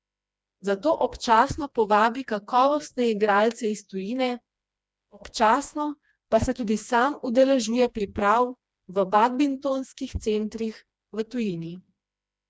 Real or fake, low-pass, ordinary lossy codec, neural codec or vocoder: fake; none; none; codec, 16 kHz, 2 kbps, FreqCodec, smaller model